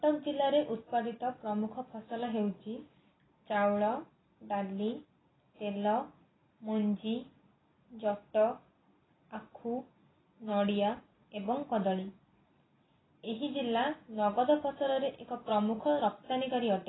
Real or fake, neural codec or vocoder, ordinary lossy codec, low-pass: real; none; AAC, 16 kbps; 7.2 kHz